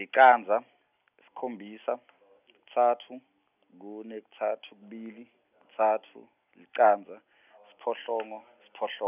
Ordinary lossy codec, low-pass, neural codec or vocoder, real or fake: none; 3.6 kHz; none; real